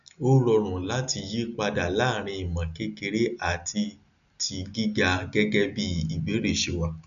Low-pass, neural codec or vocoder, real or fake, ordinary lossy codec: 7.2 kHz; none; real; none